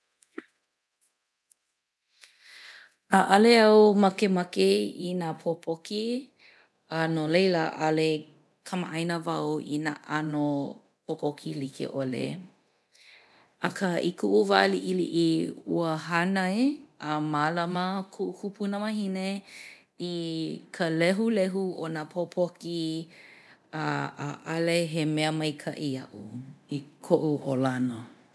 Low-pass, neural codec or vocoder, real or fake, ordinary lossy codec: none; codec, 24 kHz, 0.9 kbps, DualCodec; fake; none